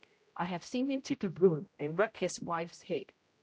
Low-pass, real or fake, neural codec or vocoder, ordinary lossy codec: none; fake; codec, 16 kHz, 0.5 kbps, X-Codec, HuBERT features, trained on general audio; none